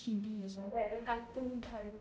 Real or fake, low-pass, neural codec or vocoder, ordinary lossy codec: fake; none; codec, 16 kHz, 0.5 kbps, X-Codec, HuBERT features, trained on balanced general audio; none